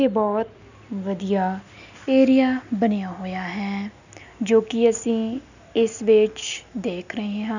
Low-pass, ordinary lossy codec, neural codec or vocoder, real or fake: 7.2 kHz; none; none; real